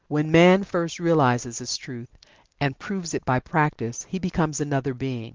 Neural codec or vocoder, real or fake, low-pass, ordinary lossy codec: none; real; 7.2 kHz; Opus, 32 kbps